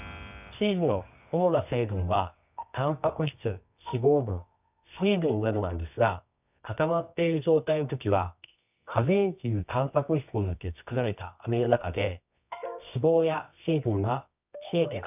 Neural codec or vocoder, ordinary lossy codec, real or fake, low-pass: codec, 24 kHz, 0.9 kbps, WavTokenizer, medium music audio release; none; fake; 3.6 kHz